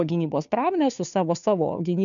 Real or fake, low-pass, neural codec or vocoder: fake; 7.2 kHz; codec, 16 kHz, 2 kbps, FunCodec, trained on Chinese and English, 25 frames a second